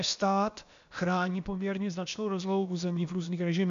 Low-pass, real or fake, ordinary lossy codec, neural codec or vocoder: 7.2 kHz; fake; MP3, 64 kbps; codec, 16 kHz, about 1 kbps, DyCAST, with the encoder's durations